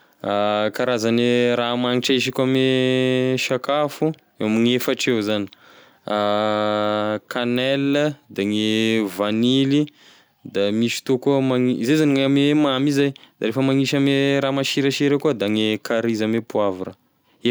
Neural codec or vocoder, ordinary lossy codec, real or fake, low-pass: none; none; real; none